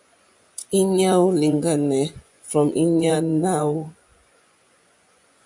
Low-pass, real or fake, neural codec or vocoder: 10.8 kHz; fake; vocoder, 24 kHz, 100 mel bands, Vocos